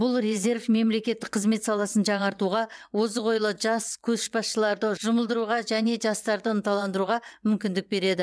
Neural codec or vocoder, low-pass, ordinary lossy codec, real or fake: vocoder, 22.05 kHz, 80 mel bands, WaveNeXt; none; none; fake